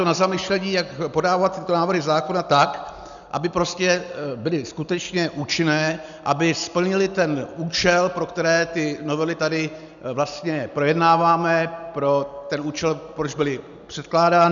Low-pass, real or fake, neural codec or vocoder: 7.2 kHz; real; none